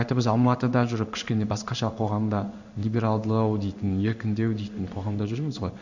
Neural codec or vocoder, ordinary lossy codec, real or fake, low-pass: codec, 16 kHz in and 24 kHz out, 1 kbps, XY-Tokenizer; none; fake; 7.2 kHz